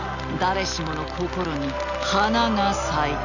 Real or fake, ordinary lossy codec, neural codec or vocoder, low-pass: real; none; none; 7.2 kHz